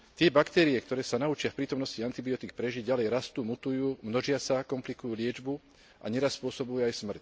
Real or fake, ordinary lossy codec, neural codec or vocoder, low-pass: real; none; none; none